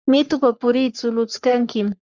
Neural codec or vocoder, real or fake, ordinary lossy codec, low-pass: codec, 44.1 kHz, 3.4 kbps, Pupu-Codec; fake; Opus, 64 kbps; 7.2 kHz